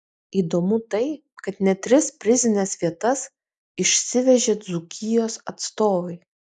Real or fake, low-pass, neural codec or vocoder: real; 10.8 kHz; none